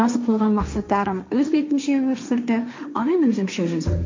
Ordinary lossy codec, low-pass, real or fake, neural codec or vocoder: MP3, 48 kbps; 7.2 kHz; fake; codec, 16 kHz, 1.1 kbps, Voila-Tokenizer